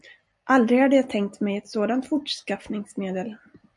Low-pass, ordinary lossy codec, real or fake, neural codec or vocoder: 10.8 kHz; MP3, 96 kbps; real; none